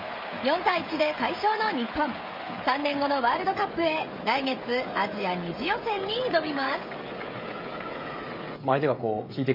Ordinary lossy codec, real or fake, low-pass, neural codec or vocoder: MP3, 24 kbps; fake; 5.4 kHz; codec, 16 kHz, 16 kbps, FreqCodec, smaller model